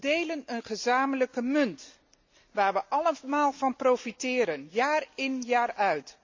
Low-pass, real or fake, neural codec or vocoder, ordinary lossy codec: 7.2 kHz; real; none; MP3, 64 kbps